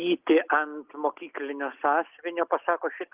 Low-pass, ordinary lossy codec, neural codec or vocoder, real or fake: 3.6 kHz; Opus, 24 kbps; none; real